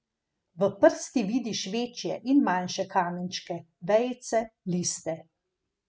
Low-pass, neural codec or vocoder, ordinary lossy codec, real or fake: none; none; none; real